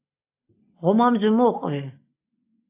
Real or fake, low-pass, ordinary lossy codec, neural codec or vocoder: real; 3.6 kHz; AAC, 32 kbps; none